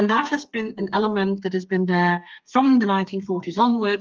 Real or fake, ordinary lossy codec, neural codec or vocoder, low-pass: fake; Opus, 24 kbps; codec, 32 kHz, 1.9 kbps, SNAC; 7.2 kHz